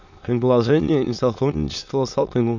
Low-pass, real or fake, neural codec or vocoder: 7.2 kHz; fake; autoencoder, 22.05 kHz, a latent of 192 numbers a frame, VITS, trained on many speakers